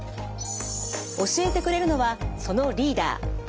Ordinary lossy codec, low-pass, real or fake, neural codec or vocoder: none; none; real; none